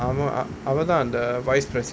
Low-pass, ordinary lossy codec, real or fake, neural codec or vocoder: none; none; real; none